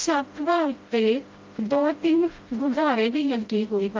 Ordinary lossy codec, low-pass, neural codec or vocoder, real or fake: Opus, 24 kbps; 7.2 kHz; codec, 16 kHz, 0.5 kbps, FreqCodec, smaller model; fake